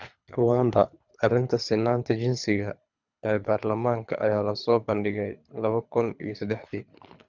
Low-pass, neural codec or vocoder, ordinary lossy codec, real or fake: 7.2 kHz; codec, 24 kHz, 3 kbps, HILCodec; none; fake